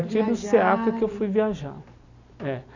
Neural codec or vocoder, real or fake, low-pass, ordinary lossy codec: none; real; 7.2 kHz; none